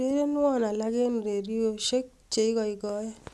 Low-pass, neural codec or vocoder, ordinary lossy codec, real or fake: none; none; none; real